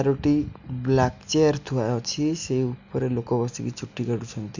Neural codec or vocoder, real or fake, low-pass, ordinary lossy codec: none; real; 7.2 kHz; none